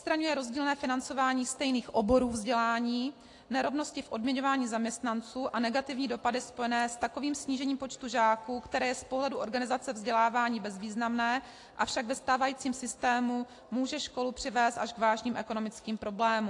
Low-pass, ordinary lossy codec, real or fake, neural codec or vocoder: 10.8 kHz; AAC, 48 kbps; real; none